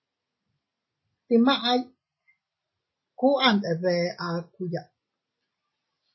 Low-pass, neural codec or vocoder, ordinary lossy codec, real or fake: 7.2 kHz; none; MP3, 24 kbps; real